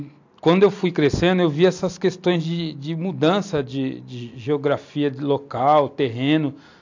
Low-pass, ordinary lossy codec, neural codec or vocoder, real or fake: 7.2 kHz; AAC, 48 kbps; none; real